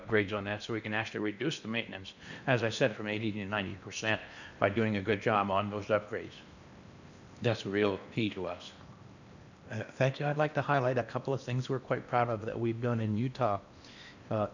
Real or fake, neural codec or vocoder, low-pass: fake; codec, 16 kHz in and 24 kHz out, 0.8 kbps, FocalCodec, streaming, 65536 codes; 7.2 kHz